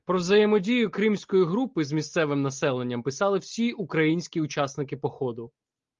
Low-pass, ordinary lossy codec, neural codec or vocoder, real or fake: 7.2 kHz; Opus, 32 kbps; none; real